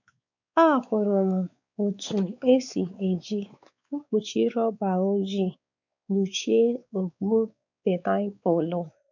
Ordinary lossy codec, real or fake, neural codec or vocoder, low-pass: none; fake; codec, 16 kHz, 4 kbps, X-Codec, WavLM features, trained on Multilingual LibriSpeech; 7.2 kHz